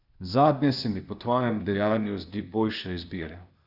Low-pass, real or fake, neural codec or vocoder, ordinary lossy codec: 5.4 kHz; fake; codec, 16 kHz, 0.8 kbps, ZipCodec; none